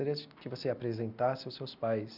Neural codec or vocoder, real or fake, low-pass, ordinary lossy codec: none; real; 5.4 kHz; none